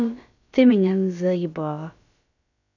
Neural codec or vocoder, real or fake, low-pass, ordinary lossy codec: codec, 16 kHz, about 1 kbps, DyCAST, with the encoder's durations; fake; 7.2 kHz; none